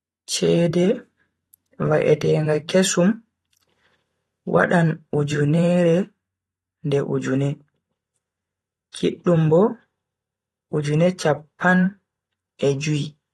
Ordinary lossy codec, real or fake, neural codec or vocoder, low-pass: AAC, 32 kbps; fake; vocoder, 44.1 kHz, 128 mel bands every 512 samples, BigVGAN v2; 19.8 kHz